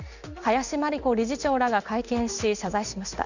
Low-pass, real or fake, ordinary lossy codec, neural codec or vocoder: 7.2 kHz; fake; none; codec, 16 kHz in and 24 kHz out, 1 kbps, XY-Tokenizer